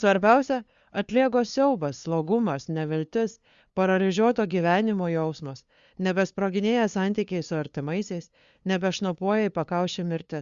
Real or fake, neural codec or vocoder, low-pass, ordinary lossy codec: fake; codec, 16 kHz, 2 kbps, FunCodec, trained on LibriTTS, 25 frames a second; 7.2 kHz; Opus, 64 kbps